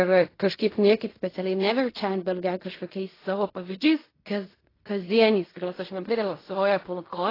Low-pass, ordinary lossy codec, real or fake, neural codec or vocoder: 5.4 kHz; AAC, 24 kbps; fake; codec, 16 kHz in and 24 kHz out, 0.4 kbps, LongCat-Audio-Codec, fine tuned four codebook decoder